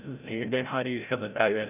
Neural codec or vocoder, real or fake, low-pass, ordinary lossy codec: codec, 16 kHz, 0.5 kbps, FreqCodec, larger model; fake; 3.6 kHz; none